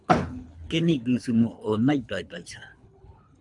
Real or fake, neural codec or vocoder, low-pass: fake; codec, 24 kHz, 3 kbps, HILCodec; 10.8 kHz